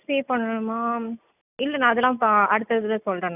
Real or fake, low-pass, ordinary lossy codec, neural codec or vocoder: real; 3.6 kHz; none; none